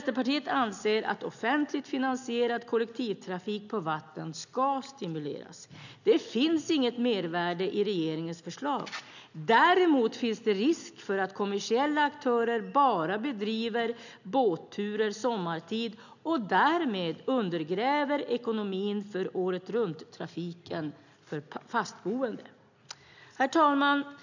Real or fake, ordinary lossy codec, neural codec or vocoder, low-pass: real; none; none; 7.2 kHz